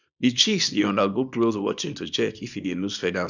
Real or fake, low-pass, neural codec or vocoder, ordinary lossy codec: fake; 7.2 kHz; codec, 24 kHz, 0.9 kbps, WavTokenizer, small release; none